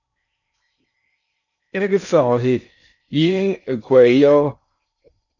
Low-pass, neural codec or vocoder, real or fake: 7.2 kHz; codec, 16 kHz in and 24 kHz out, 0.6 kbps, FocalCodec, streaming, 4096 codes; fake